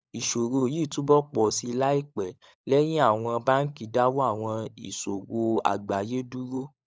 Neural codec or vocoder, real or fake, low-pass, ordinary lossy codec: codec, 16 kHz, 16 kbps, FunCodec, trained on LibriTTS, 50 frames a second; fake; none; none